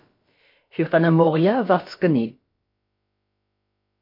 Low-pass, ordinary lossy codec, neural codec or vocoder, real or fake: 5.4 kHz; MP3, 32 kbps; codec, 16 kHz, about 1 kbps, DyCAST, with the encoder's durations; fake